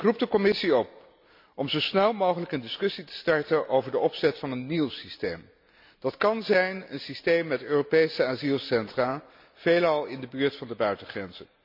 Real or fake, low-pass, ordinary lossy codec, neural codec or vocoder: real; 5.4 kHz; MP3, 48 kbps; none